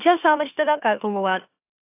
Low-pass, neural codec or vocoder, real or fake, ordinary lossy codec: 3.6 kHz; autoencoder, 44.1 kHz, a latent of 192 numbers a frame, MeloTTS; fake; AAC, 24 kbps